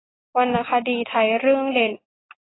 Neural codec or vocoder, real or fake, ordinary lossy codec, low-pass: none; real; AAC, 16 kbps; 7.2 kHz